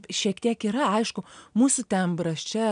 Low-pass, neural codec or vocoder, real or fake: 9.9 kHz; none; real